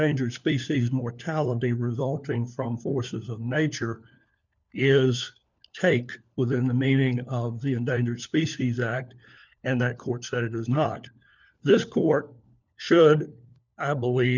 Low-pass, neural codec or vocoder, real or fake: 7.2 kHz; codec, 16 kHz, 4 kbps, FunCodec, trained on LibriTTS, 50 frames a second; fake